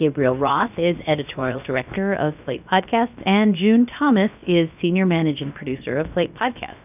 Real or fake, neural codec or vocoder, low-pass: fake; codec, 16 kHz, about 1 kbps, DyCAST, with the encoder's durations; 3.6 kHz